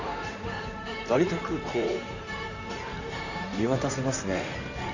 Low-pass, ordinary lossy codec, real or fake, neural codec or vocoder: 7.2 kHz; none; fake; codec, 16 kHz in and 24 kHz out, 2.2 kbps, FireRedTTS-2 codec